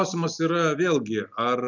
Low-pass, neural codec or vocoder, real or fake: 7.2 kHz; none; real